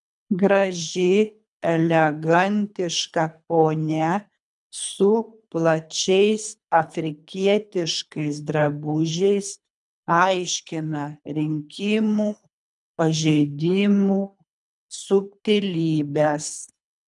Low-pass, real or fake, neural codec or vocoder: 10.8 kHz; fake; codec, 24 kHz, 3 kbps, HILCodec